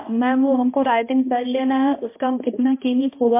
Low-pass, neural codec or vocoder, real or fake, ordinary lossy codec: 3.6 kHz; codec, 16 kHz, 1 kbps, X-Codec, HuBERT features, trained on balanced general audio; fake; MP3, 24 kbps